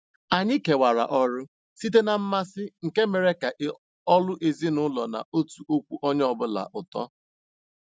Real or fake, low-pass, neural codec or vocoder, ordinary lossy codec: real; none; none; none